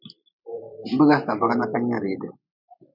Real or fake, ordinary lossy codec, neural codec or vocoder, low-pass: fake; MP3, 48 kbps; vocoder, 44.1 kHz, 128 mel bands every 256 samples, BigVGAN v2; 5.4 kHz